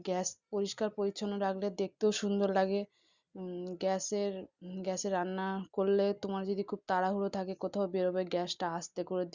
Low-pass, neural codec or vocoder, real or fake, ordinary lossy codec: 7.2 kHz; none; real; Opus, 64 kbps